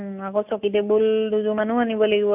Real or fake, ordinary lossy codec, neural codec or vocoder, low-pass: real; AAC, 32 kbps; none; 3.6 kHz